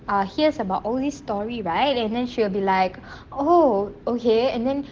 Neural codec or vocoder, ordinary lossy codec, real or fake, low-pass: none; Opus, 16 kbps; real; 7.2 kHz